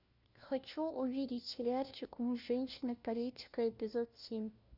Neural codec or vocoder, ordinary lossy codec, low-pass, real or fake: codec, 16 kHz, 1 kbps, FunCodec, trained on LibriTTS, 50 frames a second; Opus, 64 kbps; 5.4 kHz; fake